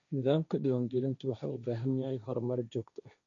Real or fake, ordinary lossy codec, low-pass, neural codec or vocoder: fake; none; 7.2 kHz; codec, 16 kHz, 1.1 kbps, Voila-Tokenizer